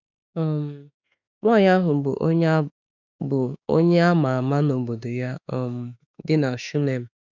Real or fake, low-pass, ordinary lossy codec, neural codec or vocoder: fake; 7.2 kHz; none; autoencoder, 48 kHz, 32 numbers a frame, DAC-VAE, trained on Japanese speech